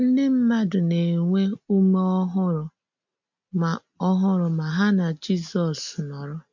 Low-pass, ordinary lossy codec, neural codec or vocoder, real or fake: 7.2 kHz; MP3, 48 kbps; none; real